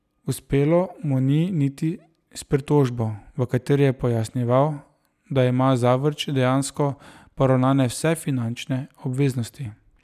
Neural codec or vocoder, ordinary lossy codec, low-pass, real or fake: none; none; 14.4 kHz; real